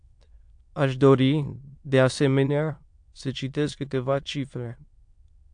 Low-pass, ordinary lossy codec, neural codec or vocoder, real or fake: 9.9 kHz; AAC, 64 kbps; autoencoder, 22.05 kHz, a latent of 192 numbers a frame, VITS, trained on many speakers; fake